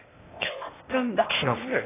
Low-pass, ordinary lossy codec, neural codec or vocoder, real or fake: 3.6 kHz; AAC, 16 kbps; codec, 16 kHz, 0.8 kbps, ZipCodec; fake